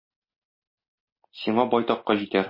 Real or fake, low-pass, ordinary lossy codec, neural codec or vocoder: real; 5.4 kHz; MP3, 24 kbps; none